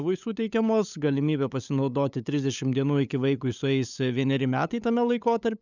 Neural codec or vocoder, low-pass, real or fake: codec, 16 kHz, 8 kbps, FunCodec, trained on LibriTTS, 25 frames a second; 7.2 kHz; fake